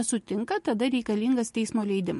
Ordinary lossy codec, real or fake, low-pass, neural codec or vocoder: MP3, 48 kbps; real; 14.4 kHz; none